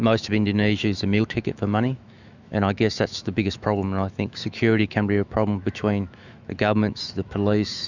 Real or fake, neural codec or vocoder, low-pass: fake; autoencoder, 48 kHz, 128 numbers a frame, DAC-VAE, trained on Japanese speech; 7.2 kHz